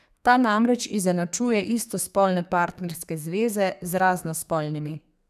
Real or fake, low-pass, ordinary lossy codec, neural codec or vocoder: fake; 14.4 kHz; none; codec, 32 kHz, 1.9 kbps, SNAC